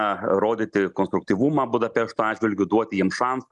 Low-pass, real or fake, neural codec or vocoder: 10.8 kHz; real; none